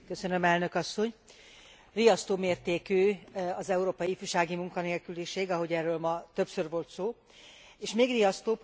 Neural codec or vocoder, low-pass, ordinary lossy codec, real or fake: none; none; none; real